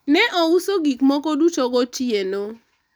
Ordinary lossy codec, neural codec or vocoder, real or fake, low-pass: none; none; real; none